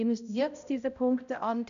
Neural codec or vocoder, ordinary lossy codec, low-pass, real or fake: codec, 16 kHz, 0.5 kbps, X-Codec, HuBERT features, trained on balanced general audio; none; 7.2 kHz; fake